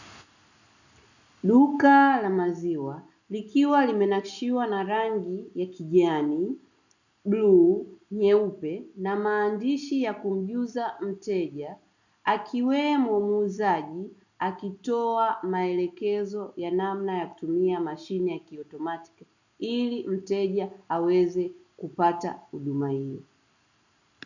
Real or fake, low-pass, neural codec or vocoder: real; 7.2 kHz; none